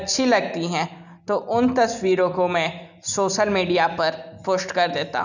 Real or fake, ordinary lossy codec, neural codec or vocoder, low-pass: real; none; none; 7.2 kHz